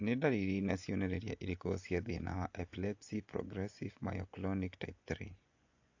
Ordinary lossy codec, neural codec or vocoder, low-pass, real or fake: AAC, 48 kbps; vocoder, 24 kHz, 100 mel bands, Vocos; 7.2 kHz; fake